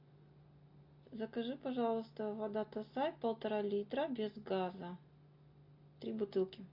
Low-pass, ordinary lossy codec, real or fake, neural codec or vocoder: 5.4 kHz; AAC, 48 kbps; real; none